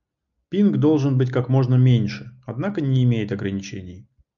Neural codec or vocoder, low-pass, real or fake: none; 7.2 kHz; real